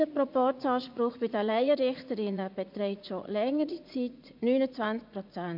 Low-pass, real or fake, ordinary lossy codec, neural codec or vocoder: 5.4 kHz; fake; MP3, 48 kbps; vocoder, 44.1 kHz, 80 mel bands, Vocos